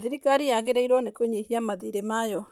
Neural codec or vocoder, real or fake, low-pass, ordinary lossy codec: vocoder, 44.1 kHz, 128 mel bands, Pupu-Vocoder; fake; 19.8 kHz; none